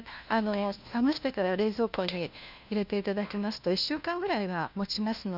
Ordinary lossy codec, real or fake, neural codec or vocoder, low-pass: none; fake; codec, 16 kHz, 1 kbps, FunCodec, trained on LibriTTS, 50 frames a second; 5.4 kHz